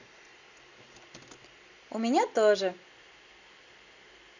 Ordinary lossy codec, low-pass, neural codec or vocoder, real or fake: none; 7.2 kHz; none; real